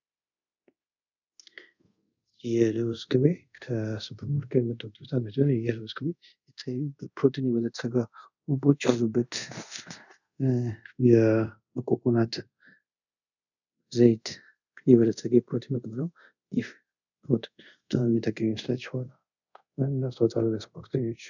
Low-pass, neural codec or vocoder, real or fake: 7.2 kHz; codec, 24 kHz, 0.5 kbps, DualCodec; fake